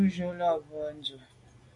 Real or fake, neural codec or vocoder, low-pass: real; none; 10.8 kHz